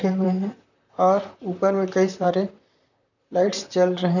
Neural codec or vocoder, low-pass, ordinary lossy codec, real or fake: vocoder, 44.1 kHz, 128 mel bands, Pupu-Vocoder; 7.2 kHz; none; fake